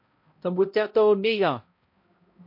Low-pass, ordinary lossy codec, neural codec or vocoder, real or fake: 5.4 kHz; MP3, 32 kbps; codec, 16 kHz, 0.5 kbps, X-Codec, HuBERT features, trained on balanced general audio; fake